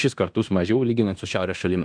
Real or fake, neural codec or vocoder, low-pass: fake; codec, 16 kHz in and 24 kHz out, 0.9 kbps, LongCat-Audio-Codec, fine tuned four codebook decoder; 9.9 kHz